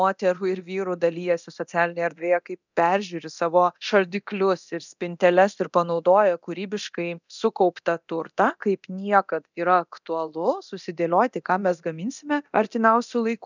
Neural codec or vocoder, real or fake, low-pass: codec, 24 kHz, 0.9 kbps, DualCodec; fake; 7.2 kHz